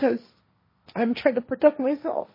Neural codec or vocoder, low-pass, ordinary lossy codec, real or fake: codec, 16 kHz, 1.1 kbps, Voila-Tokenizer; 5.4 kHz; MP3, 24 kbps; fake